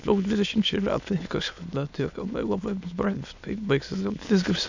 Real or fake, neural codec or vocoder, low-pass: fake; autoencoder, 22.05 kHz, a latent of 192 numbers a frame, VITS, trained on many speakers; 7.2 kHz